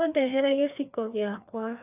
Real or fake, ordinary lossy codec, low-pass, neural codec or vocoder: fake; none; 3.6 kHz; codec, 16 kHz, 2 kbps, FreqCodec, larger model